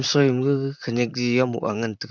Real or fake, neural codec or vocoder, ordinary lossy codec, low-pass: fake; vocoder, 44.1 kHz, 128 mel bands, Pupu-Vocoder; none; 7.2 kHz